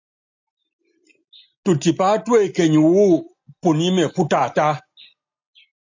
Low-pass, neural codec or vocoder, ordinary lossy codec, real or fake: 7.2 kHz; none; AAC, 48 kbps; real